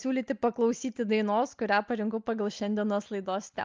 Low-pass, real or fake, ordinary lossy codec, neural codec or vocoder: 7.2 kHz; real; Opus, 24 kbps; none